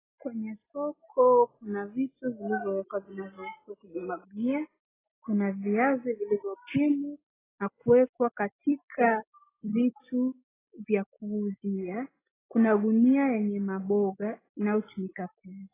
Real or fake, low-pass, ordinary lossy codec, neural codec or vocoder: real; 3.6 kHz; AAC, 16 kbps; none